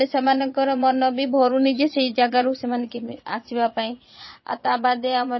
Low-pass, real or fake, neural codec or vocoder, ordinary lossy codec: 7.2 kHz; fake; vocoder, 22.05 kHz, 80 mel bands, Vocos; MP3, 24 kbps